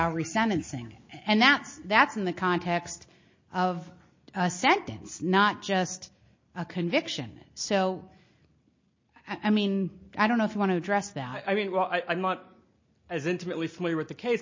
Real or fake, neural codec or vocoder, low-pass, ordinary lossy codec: real; none; 7.2 kHz; MP3, 32 kbps